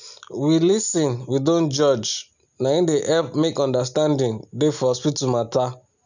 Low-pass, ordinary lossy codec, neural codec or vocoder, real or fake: 7.2 kHz; none; none; real